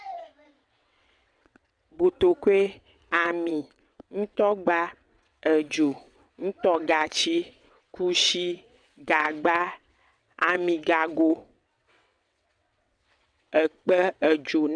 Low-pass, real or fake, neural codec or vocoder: 9.9 kHz; fake; vocoder, 22.05 kHz, 80 mel bands, WaveNeXt